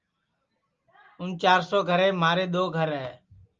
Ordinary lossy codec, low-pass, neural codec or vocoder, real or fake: Opus, 32 kbps; 7.2 kHz; none; real